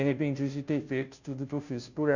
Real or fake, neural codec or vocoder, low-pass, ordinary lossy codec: fake; codec, 16 kHz, 0.5 kbps, FunCodec, trained on Chinese and English, 25 frames a second; 7.2 kHz; none